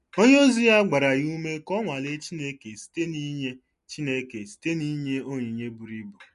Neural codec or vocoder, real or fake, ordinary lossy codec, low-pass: none; real; MP3, 48 kbps; 14.4 kHz